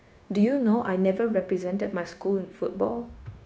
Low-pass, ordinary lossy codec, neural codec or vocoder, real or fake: none; none; codec, 16 kHz, 0.9 kbps, LongCat-Audio-Codec; fake